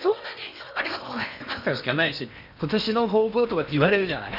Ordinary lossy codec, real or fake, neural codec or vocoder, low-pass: none; fake; codec, 16 kHz in and 24 kHz out, 0.8 kbps, FocalCodec, streaming, 65536 codes; 5.4 kHz